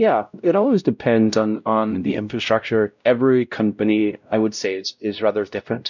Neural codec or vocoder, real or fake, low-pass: codec, 16 kHz, 0.5 kbps, X-Codec, WavLM features, trained on Multilingual LibriSpeech; fake; 7.2 kHz